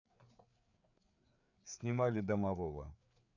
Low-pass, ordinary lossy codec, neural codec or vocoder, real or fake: 7.2 kHz; none; codec, 16 kHz, 4 kbps, FreqCodec, larger model; fake